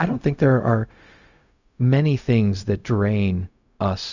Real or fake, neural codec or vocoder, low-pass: fake; codec, 16 kHz, 0.4 kbps, LongCat-Audio-Codec; 7.2 kHz